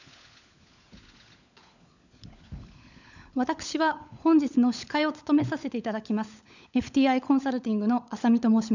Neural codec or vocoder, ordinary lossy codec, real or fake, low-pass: codec, 16 kHz, 16 kbps, FunCodec, trained on LibriTTS, 50 frames a second; none; fake; 7.2 kHz